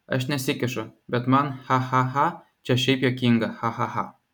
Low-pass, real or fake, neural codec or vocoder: 19.8 kHz; real; none